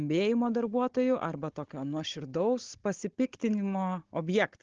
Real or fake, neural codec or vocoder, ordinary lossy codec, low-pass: fake; codec, 16 kHz, 16 kbps, FunCodec, trained on Chinese and English, 50 frames a second; Opus, 16 kbps; 7.2 kHz